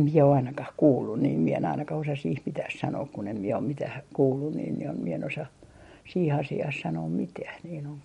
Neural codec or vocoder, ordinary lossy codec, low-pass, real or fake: none; MP3, 48 kbps; 19.8 kHz; real